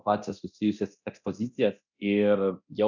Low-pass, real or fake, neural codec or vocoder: 7.2 kHz; fake; codec, 24 kHz, 0.9 kbps, DualCodec